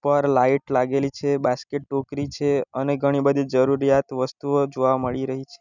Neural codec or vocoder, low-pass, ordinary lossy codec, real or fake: none; 7.2 kHz; none; real